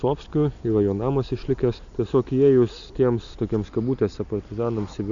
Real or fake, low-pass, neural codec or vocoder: real; 7.2 kHz; none